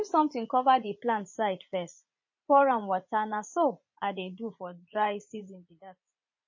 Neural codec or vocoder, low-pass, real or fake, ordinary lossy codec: vocoder, 44.1 kHz, 80 mel bands, Vocos; 7.2 kHz; fake; MP3, 32 kbps